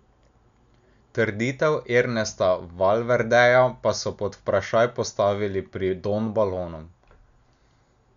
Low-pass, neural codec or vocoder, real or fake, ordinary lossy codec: 7.2 kHz; none; real; none